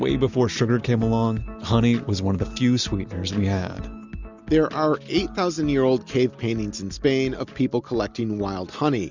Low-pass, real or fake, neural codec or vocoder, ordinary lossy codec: 7.2 kHz; real; none; Opus, 64 kbps